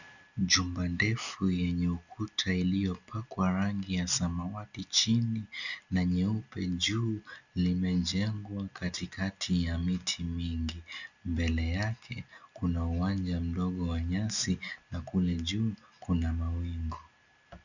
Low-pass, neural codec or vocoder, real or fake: 7.2 kHz; none; real